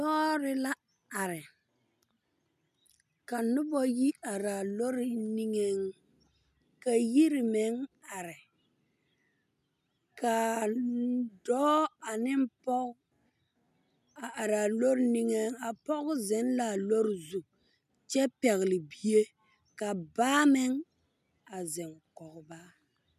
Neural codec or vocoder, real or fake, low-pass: none; real; 14.4 kHz